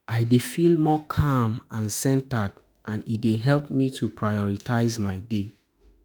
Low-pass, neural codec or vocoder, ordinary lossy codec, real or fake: none; autoencoder, 48 kHz, 32 numbers a frame, DAC-VAE, trained on Japanese speech; none; fake